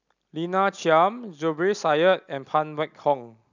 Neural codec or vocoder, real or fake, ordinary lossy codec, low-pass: none; real; none; 7.2 kHz